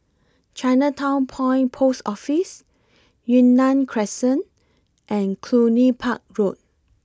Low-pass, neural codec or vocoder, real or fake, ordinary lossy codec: none; none; real; none